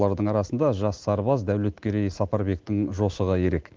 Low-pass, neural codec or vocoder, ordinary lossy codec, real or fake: 7.2 kHz; none; Opus, 16 kbps; real